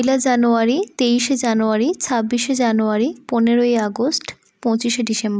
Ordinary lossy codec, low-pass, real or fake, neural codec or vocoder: none; none; real; none